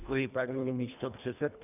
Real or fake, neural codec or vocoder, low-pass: fake; codec, 24 kHz, 1.5 kbps, HILCodec; 3.6 kHz